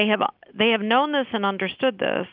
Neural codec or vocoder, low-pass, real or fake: none; 5.4 kHz; real